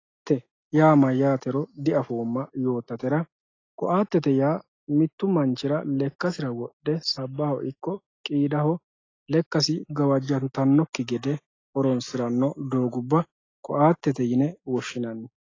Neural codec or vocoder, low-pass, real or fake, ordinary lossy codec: none; 7.2 kHz; real; AAC, 32 kbps